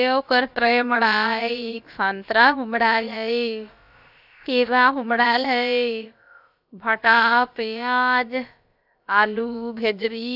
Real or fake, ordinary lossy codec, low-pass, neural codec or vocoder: fake; none; 5.4 kHz; codec, 16 kHz, about 1 kbps, DyCAST, with the encoder's durations